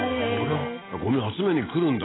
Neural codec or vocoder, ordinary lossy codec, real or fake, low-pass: none; AAC, 16 kbps; real; 7.2 kHz